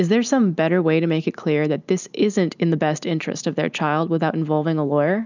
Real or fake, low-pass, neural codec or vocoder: real; 7.2 kHz; none